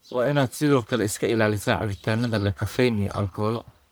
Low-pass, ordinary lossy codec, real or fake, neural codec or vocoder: none; none; fake; codec, 44.1 kHz, 1.7 kbps, Pupu-Codec